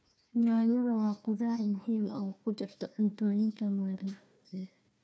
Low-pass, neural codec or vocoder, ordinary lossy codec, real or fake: none; codec, 16 kHz, 1 kbps, FunCodec, trained on Chinese and English, 50 frames a second; none; fake